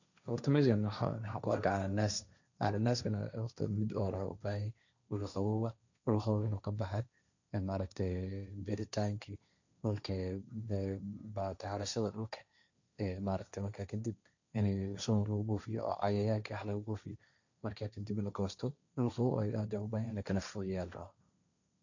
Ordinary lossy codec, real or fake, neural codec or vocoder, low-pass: none; fake; codec, 16 kHz, 1.1 kbps, Voila-Tokenizer; 7.2 kHz